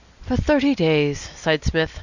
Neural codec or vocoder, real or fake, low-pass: none; real; 7.2 kHz